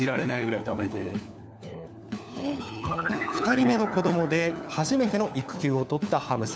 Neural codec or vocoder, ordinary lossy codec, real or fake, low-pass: codec, 16 kHz, 4 kbps, FunCodec, trained on LibriTTS, 50 frames a second; none; fake; none